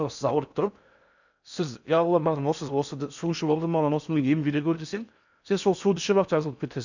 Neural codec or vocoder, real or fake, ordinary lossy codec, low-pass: codec, 16 kHz in and 24 kHz out, 0.6 kbps, FocalCodec, streaming, 4096 codes; fake; none; 7.2 kHz